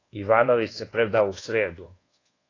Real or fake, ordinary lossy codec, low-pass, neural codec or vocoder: fake; AAC, 32 kbps; 7.2 kHz; codec, 24 kHz, 1.2 kbps, DualCodec